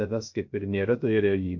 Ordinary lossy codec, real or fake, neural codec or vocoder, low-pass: MP3, 64 kbps; fake; codec, 16 kHz, 0.3 kbps, FocalCodec; 7.2 kHz